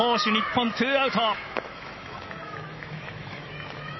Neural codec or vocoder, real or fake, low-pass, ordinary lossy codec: autoencoder, 48 kHz, 128 numbers a frame, DAC-VAE, trained on Japanese speech; fake; 7.2 kHz; MP3, 24 kbps